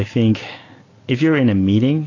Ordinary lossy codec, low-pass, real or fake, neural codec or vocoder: AAC, 32 kbps; 7.2 kHz; real; none